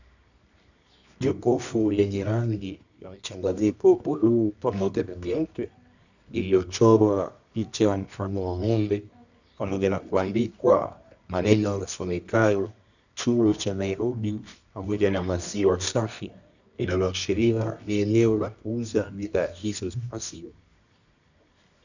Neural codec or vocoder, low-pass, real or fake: codec, 24 kHz, 0.9 kbps, WavTokenizer, medium music audio release; 7.2 kHz; fake